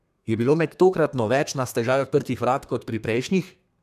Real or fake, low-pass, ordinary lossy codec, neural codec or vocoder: fake; 14.4 kHz; none; codec, 32 kHz, 1.9 kbps, SNAC